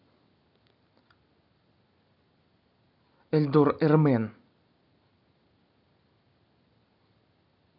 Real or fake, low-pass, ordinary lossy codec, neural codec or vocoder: real; 5.4 kHz; none; none